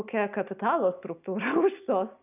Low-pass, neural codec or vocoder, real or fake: 3.6 kHz; none; real